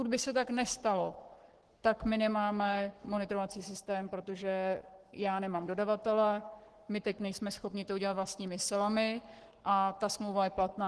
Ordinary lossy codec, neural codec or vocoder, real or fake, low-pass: Opus, 16 kbps; codec, 44.1 kHz, 7.8 kbps, Pupu-Codec; fake; 10.8 kHz